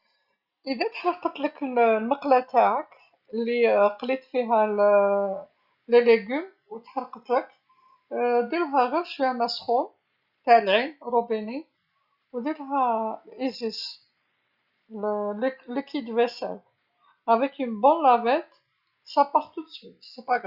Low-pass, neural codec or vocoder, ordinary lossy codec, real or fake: 5.4 kHz; none; Opus, 64 kbps; real